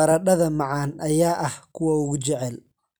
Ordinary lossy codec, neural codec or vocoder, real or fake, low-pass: none; none; real; none